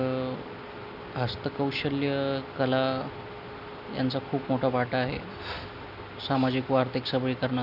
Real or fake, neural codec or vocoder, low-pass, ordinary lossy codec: real; none; 5.4 kHz; none